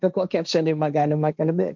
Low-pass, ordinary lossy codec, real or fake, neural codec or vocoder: 7.2 kHz; MP3, 64 kbps; fake; codec, 16 kHz, 1.1 kbps, Voila-Tokenizer